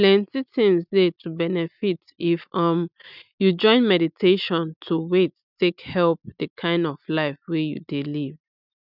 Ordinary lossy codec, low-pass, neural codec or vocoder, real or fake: none; 5.4 kHz; none; real